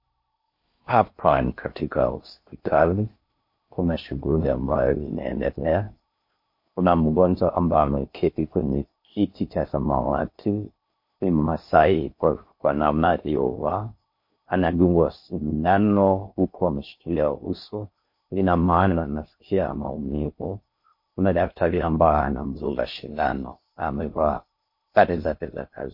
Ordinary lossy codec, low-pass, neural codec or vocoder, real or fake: MP3, 32 kbps; 5.4 kHz; codec, 16 kHz in and 24 kHz out, 0.6 kbps, FocalCodec, streaming, 4096 codes; fake